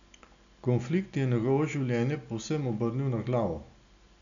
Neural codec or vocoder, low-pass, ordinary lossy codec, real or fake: none; 7.2 kHz; none; real